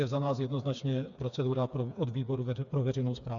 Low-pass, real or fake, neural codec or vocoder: 7.2 kHz; fake; codec, 16 kHz, 4 kbps, FreqCodec, smaller model